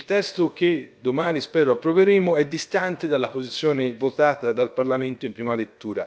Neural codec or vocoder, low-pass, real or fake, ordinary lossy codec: codec, 16 kHz, about 1 kbps, DyCAST, with the encoder's durations; none; fake; none